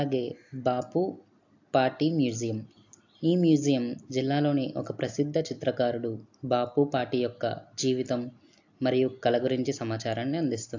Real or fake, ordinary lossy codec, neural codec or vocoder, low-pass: real; AAC, 48 kbps; none; 7.2 kHz